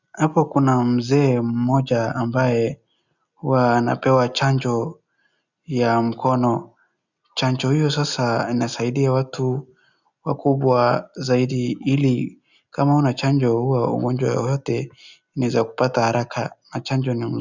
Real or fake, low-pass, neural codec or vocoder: real; 7.2 kHz; none